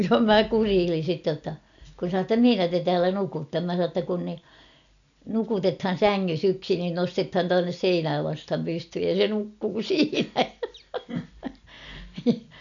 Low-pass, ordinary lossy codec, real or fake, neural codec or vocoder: 7.2 kHz; none; real; none